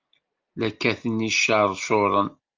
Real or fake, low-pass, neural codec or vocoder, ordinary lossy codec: real; 7.2 kHz; none; Opus, 24 kbps